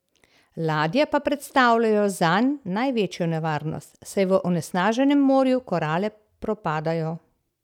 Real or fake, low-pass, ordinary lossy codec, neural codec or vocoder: real; 19.8 kHz; none; none